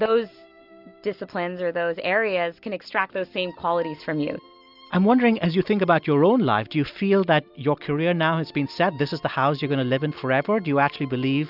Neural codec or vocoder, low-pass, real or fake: none; 5.4 kHz; real